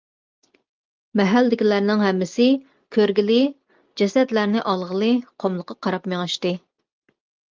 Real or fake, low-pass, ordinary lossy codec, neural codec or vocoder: real; 7.2 kHz; Opus, 24 kbps; none